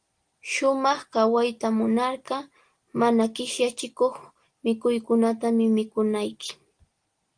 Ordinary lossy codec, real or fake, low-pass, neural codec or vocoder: Opus, 24 kbps; real; 9.9 kHz; none